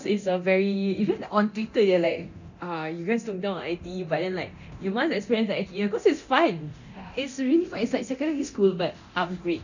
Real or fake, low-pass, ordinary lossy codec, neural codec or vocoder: fake; 7.2 kHz; none; codec, 24 kHz, 0.9 kbps, DualCodec